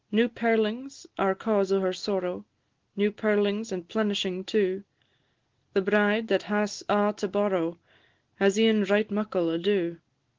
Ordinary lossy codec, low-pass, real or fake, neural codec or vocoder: Opus, 16 kbps; 7.2 kHz; real; none